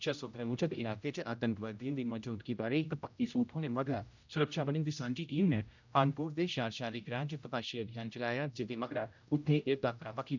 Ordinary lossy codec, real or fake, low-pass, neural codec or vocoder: none; fake; 7.2 kHz; codec, 16 kHz, 0.5 kbps, X-Codec, HuBERT features, trained on general audio